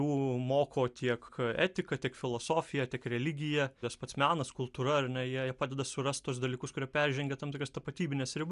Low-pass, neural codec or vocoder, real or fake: 10.8 kHz; none; real